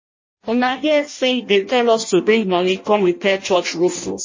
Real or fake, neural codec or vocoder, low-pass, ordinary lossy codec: fake; codec, 16 kHz in and 24 kHz out, 0.6 kbps, FireRedTTS-2 codec; 7.2 kHz; MP3, 32 kbps